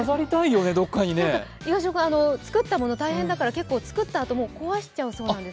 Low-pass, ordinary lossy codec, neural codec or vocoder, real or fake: none; none; none; real